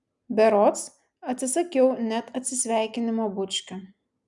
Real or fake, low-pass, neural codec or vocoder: real; 10.8 kHz; none